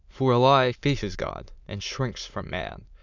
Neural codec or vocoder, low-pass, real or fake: autoencoder, 22.05 kHz, a latent of 192 numbers a frame, VITS, trained on many speakers; 7.2 kHz; fake